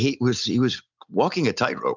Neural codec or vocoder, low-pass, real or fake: none; 7.2 kHz; real